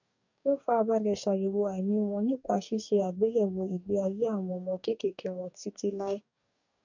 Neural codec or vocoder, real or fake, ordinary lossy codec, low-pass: codec, 44.1 kHz, 2.6 kbps, DAC; fake; none; 7.2 kHz